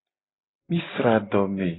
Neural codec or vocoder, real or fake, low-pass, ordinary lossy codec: none; real; 7.2 kHz; AAC, 16 kbps